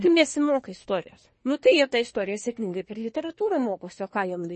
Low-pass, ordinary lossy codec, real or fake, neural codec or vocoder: 10.8 kHz; MP3, 32 kbps; fake; codec, 24 kHz, 1 kbps, SNAC